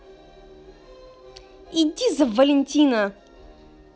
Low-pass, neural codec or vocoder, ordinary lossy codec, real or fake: none; none; none; real